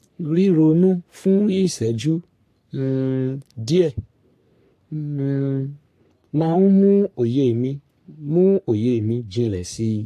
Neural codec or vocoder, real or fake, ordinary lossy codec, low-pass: codec, 44.1 kHz, 3.4 kbps, Pupu-Codec; fake; AAC, 64 kbps; 14.4 kHz